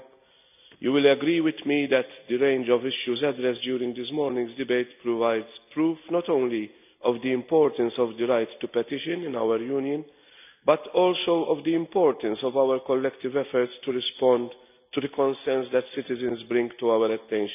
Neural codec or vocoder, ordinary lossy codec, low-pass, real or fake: none; none; 3.6 kHz; real